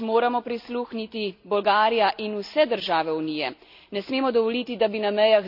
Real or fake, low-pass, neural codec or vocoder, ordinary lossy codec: real; 5.4 kHz; none; none